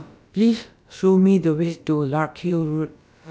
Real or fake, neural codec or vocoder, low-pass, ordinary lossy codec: fake; codec, 16 kHz, about 1 kbps, DyCAST, with the encoder's durations; none; none